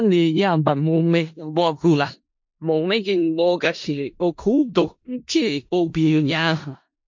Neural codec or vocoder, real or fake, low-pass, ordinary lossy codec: codec, 16 kHz in and 24 kHz out, 0.4 kbps, LongCat-Audio-Codec, four codebook decoder; fake; 7.2 kHz; MP3, 48 kbps